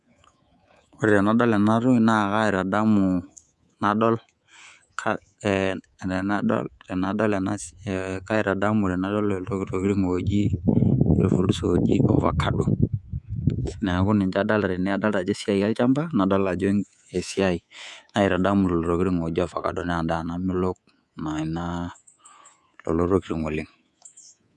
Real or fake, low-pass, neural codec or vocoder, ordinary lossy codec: fake; none; codec, 24 kHz, 3.1 kbps, DualCodec; none